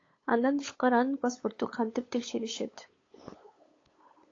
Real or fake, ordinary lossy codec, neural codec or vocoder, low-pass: fake; AAC, 32 kbps; codec, 16 kHz, 8 kbps, FunCodec, trained on LibriTTS, 25 frames a second; 7.2 kHz